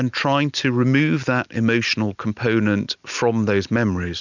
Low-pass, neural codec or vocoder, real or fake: 7.2 kHz; none; real